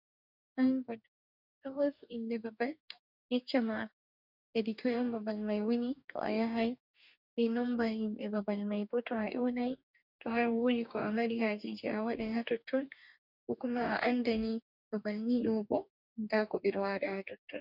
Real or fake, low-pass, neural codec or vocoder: fake; 5.4 kHz; codec, 44.1 kHz, 2.6 kbps, DAC